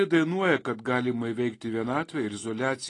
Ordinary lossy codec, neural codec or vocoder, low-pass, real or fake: AAC, 32 kbps; none; 10.8 kHz; real